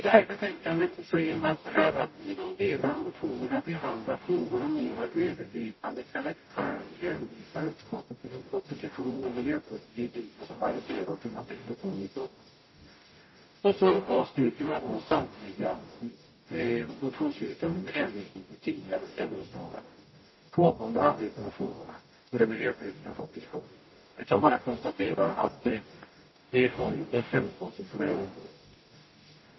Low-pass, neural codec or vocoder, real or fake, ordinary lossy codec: 7.2 kHz; codec, 44.1 kHz, 0.9 kbps, DAC; fake; MP3, 24 kbps